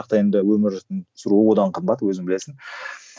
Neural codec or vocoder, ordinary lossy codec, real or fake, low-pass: none; none; real; none